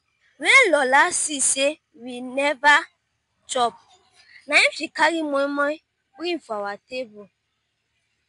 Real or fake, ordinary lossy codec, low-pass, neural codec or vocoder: real; MP3, 64 kbps; 10.8 kHz; none